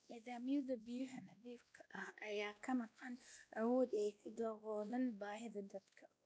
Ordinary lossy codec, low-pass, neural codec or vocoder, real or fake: none; none; codec, 16 kHz, 1 kbps, X-Codec, WavLM features, trained on Multilingual LibriSpeech; fake